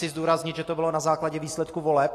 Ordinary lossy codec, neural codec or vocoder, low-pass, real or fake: AAC, 48 kbps; none; 14.4 kHz; real